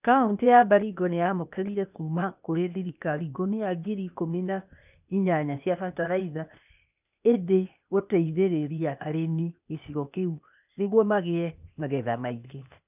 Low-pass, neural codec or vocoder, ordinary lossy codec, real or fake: 3.6 kHz; codec, 16 kHz, 0.8 kbps, ZipCodec; none; fake